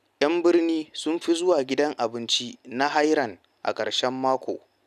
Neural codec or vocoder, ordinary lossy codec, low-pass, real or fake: none; none; 14.4 kHz; real